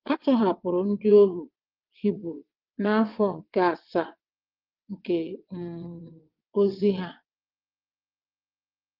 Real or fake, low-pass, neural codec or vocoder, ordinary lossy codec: fake; 5.4 kHz; vocoder, 22.05 kHz, 80 mel bands, WaveNeXt; Opus, 32 kbps